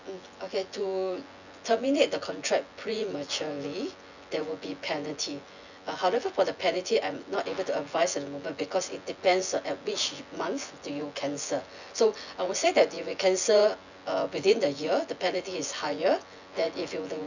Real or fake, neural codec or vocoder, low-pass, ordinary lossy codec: fake; vocoder, 24 kHz, 100 mel bands, Vocos; 7.2 kHz; none